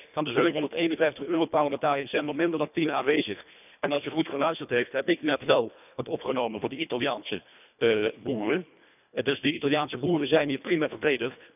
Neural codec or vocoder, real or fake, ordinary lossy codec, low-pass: codec, 24 kHz, 1.5 kbps, HILCodec; fake; none; 3.6 kHz